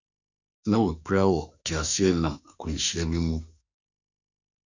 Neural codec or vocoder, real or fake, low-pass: autoencoder, 48 kHz, 32 numbers a frame, DAC-VAE, trained on Japanese speech; fake; 7.2 kHz